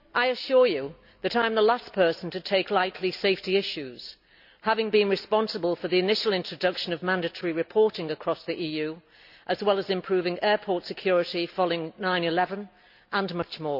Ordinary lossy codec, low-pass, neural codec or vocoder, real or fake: none; 5.4 kHz; none; real